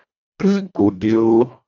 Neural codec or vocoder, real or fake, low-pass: codec, 24 kHz, 1.5 kbps, HILCodec; fake; 7.2 kHz